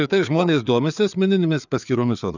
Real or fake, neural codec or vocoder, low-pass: fake; codec, 16 kHz, 4 kbps, FreqCodec, larger model; 7.2 kHz